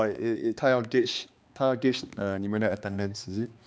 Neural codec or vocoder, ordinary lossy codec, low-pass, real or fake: codec, 16 kHz, 2 kbps, X-Codec, HuBERT features, trained on balanced general audio; none; none; fake